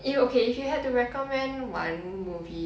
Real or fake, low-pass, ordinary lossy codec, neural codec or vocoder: real; none; none; none